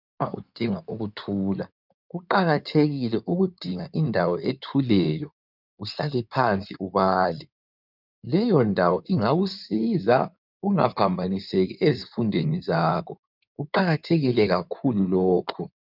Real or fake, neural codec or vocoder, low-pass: fake; codec, 16 kHz in and 24 kHz out, 2.2 kbps, FireRedTTS-2 codec; 5.4 kHz